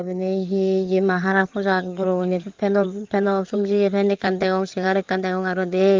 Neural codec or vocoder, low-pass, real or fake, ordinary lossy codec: codec, 16 kHz in and 24 kHz out, 2.2 kbps, FireRedTTS-2 codec; 7.2 kHz; fake; Opus, 24 kbps